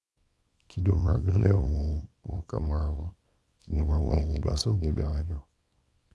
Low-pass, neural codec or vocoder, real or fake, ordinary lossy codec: none; codec, 24 kHz, 0.9 kbps, WavTokenizer, small release; fake; none